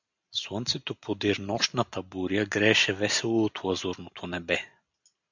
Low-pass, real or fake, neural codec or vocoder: 7.2 kHz; real; none